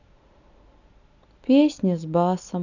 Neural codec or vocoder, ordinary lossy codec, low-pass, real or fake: none; none; 7.2 kHz; real